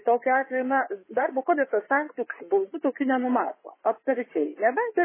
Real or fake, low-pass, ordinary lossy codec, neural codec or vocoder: fake; 3.6 kHz; MP3, 16 kbps; codec, 16 kHz in and 24 kHz out, 2.2 kbps, FireRedTTS-2 codec